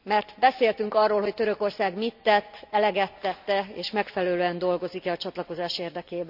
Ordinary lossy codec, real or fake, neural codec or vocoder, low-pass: none; real; none; 5.4 kHz